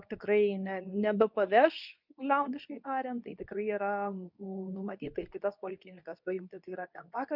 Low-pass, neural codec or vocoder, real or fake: 5.4 kHz; codec, 24 kHz, 0.9 kbps, WavTokenizer, medium speech release version 2; fake